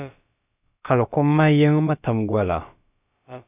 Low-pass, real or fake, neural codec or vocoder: 3.6 kHz; fake; codec, 16 kHz, about 1 kbps, DyCAST, with the encoder's durations